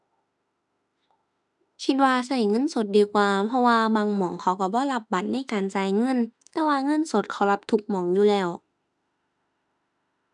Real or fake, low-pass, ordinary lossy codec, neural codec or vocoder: fake; 10.8 kHz; none; autoencoder, 48 kHz, 32 numbers a frame, DAC-VAE, trained on Japanese speech